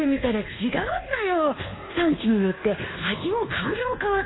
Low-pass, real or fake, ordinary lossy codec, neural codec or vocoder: 7.2 kHz; fake; AAC, 16 kbps; codec, 24 kHz, 1.2 kbps, DualCodec